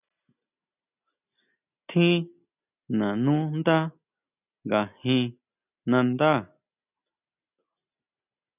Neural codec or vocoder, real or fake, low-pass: none; real; 3.6 kHz